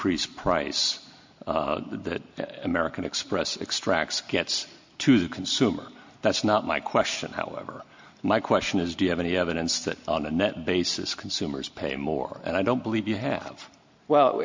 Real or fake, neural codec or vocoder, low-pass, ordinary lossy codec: real; none; 7.2 kHz; MP3, 48 kbps